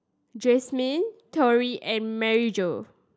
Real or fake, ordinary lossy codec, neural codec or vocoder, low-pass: real; none; none; none